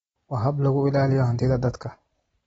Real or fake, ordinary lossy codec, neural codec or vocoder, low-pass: real; AAC, 24 kbps; none; 19.8 kHz